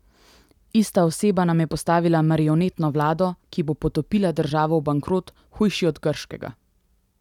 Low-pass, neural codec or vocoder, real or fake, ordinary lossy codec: 19.8 kHz; none; real; none